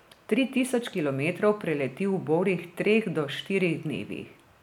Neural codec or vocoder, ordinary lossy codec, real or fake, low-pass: none; none; real; 19.8 kHz